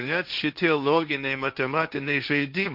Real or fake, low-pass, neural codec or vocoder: fake; 5.4 kHz; codec, 16 kHz, 1.1 kbps, Voila-Tokenizer